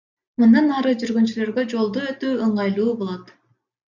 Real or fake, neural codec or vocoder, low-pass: real; none; 7.2 kHz